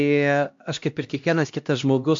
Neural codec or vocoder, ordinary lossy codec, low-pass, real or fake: codec, 16 kHz, 1 kbps, X-Codec, HuBERT features, trained on LibriSpeech; MP3, 48 kbps; 7.2 kHz; fake